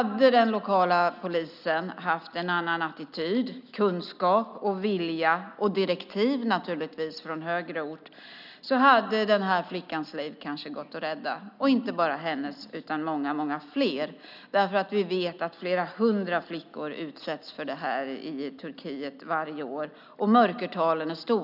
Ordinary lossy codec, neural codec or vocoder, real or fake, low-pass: none; none; real; 5.4 kHz